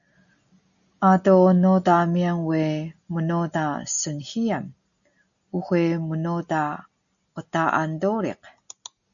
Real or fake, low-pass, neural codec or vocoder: real; 7.2 kHz; none